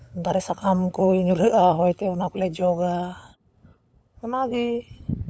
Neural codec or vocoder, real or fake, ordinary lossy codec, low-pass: codec, 16 kHz, 8 kbps, FunCodec, trained on LibriTTS, 25 frames a second; fake; none; none